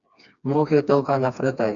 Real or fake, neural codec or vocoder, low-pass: fake; codec, 16 kHz, 2 kbps, FreqCodec, smaller model; 7.2 kHz